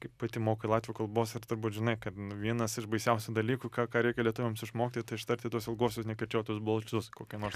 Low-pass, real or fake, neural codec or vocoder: 14.4 kHz; real; none